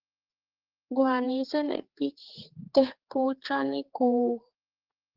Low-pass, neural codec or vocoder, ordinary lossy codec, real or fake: 5.4 kHz; codec, 16 kHz, 4 kbps, X-Codec, HuBERT features, trained on general audio; Opus, 16 kbps; fake